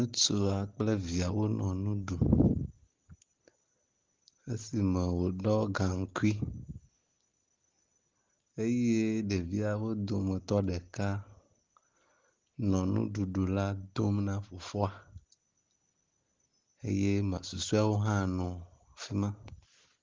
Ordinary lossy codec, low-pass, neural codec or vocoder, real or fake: Opus, 16 kbps; 7.2 kHz; none; real